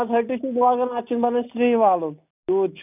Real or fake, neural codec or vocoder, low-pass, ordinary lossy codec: real; none; 3.6 kHz; none